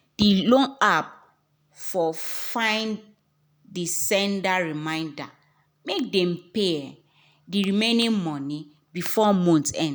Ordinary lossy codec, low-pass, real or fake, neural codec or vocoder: none; none; real; none